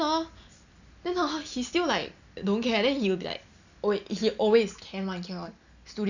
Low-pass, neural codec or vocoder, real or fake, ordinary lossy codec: 7.2 kHz; none; real; none